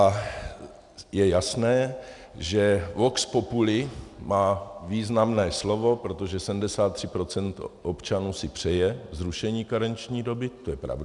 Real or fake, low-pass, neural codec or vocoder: real; 10.8 kHz; none